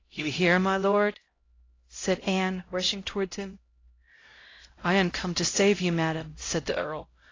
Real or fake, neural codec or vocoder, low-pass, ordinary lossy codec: fake; codec, 16 kHz, 0.5 kbps, X-Codec, HuBERT features, trained on LibriSpeech; 7.2 kHz; AAC, 32 kbps